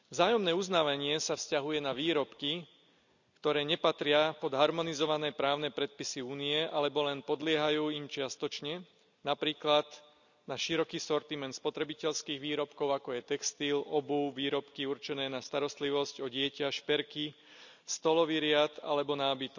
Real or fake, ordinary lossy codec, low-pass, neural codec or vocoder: real; none; 7.2 kHz; none